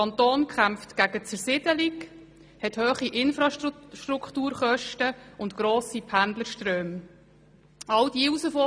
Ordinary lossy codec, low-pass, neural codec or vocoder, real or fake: none; none; none; real